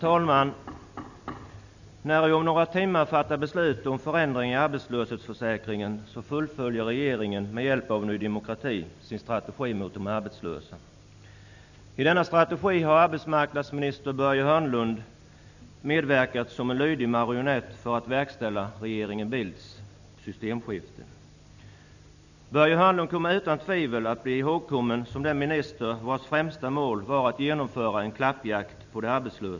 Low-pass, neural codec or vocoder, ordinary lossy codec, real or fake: 7.2 kHz; none; none; real